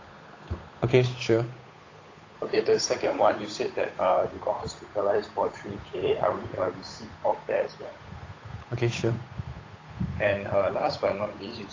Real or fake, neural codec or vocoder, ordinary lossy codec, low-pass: fake; codec, 16 kHz, 8 kbps, FunCodec, trained on Chinese and English, 25 frames a second; AAC, 32 kbps; 7.2 kHz